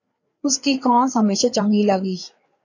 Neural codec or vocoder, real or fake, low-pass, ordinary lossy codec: codec, 16 kHz, 4 kbps, FreqCodec, larger model; fake; 7.2 kHz; AAC, 48 kbps